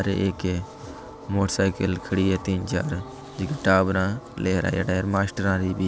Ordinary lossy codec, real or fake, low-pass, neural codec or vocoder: none; real; none; none